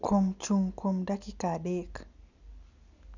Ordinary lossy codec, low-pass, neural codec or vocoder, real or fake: none; 7.2 kHz; none; real